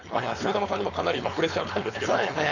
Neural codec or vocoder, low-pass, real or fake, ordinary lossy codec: codec, 16 kHz, 4.8 kbps, FACodec; 7.2 kHz; fake; none